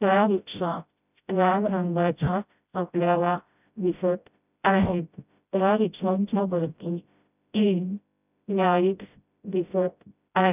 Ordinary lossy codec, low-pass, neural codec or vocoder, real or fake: none; 3.6 kHz; codec, 16 kHz, 0.5 kbps, FreqCodec, smaller model; fake